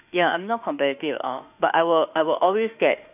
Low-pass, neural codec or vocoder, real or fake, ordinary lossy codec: 3.6 kHz; autoencoder, 48 kHz, 32 numbers a frame, DAC-VAE, trained on Japanese speech; fake; none